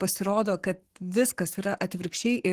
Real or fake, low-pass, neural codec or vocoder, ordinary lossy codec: fake; 14.4 kHz; codec, 44.1 kHz, 7.8 kbps, Pupu-Codec; Opus, 16 kbps